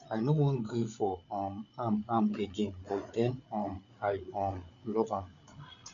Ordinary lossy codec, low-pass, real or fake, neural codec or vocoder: none; 7.2 kHz; fake; codec, 16 kHz, 8 kbps, FreqCodec, larger model